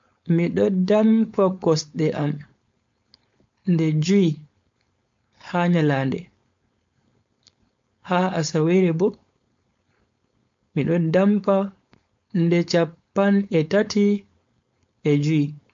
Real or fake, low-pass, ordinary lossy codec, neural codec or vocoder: fake; 7.2 kHz; MP3, 48 kbps; codec, 16 kHz, 4.8 kbps, FACodec